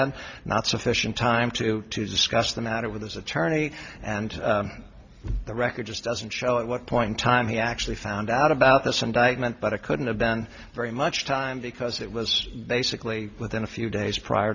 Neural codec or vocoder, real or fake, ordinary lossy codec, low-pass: none; real; Opus, 64 kbps; 7.2 kHz